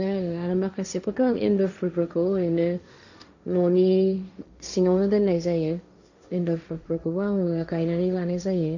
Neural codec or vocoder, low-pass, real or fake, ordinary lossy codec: codec, 16 kHz, 1.1 kbps, Voila-Tokenizer; 7.2 kHz; fake; none